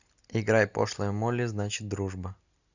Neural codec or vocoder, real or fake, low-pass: none; real; 7.2 kHz